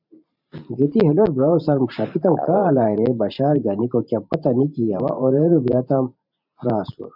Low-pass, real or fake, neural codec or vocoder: 5.4 kHz; fake; vocoder, 44.1 kHz, 128 mel bands every 512 samples, BigVGAN v2